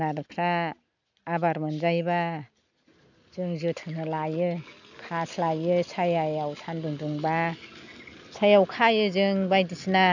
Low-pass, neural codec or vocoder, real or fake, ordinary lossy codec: 7.2 kHz; none; real; none